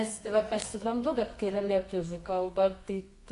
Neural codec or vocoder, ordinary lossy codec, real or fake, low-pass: codec, 24 kHz, 0.9 kbps, WavTokenizer, medium music audio release; AAC, 64 kbps; fake; 10.8 kHz